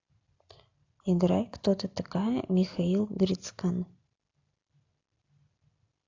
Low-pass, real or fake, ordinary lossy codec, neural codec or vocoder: 7.2 kHz; real; AAC, 48 kbps; none